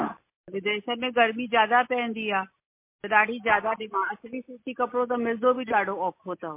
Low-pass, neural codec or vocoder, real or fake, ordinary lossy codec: 3.6 kHz; none; real; MP3, 24 kbps